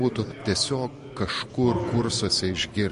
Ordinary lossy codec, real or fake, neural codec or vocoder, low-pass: MP3, 48 kbps; real; none; 14.4 kHz